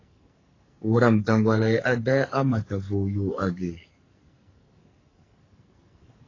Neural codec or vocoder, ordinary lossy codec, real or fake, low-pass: codec, 44.1 kHz, 2.6 kbps, SNAC; AAC, 32 kbps; fake; 7.2 kHz